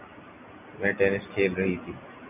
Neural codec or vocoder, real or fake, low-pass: none; real; 3.6 kHz